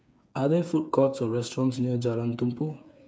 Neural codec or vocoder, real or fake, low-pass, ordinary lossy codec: codec, 16 kHz, 8 kbps, FreqCodec, smaller model; fake; none; none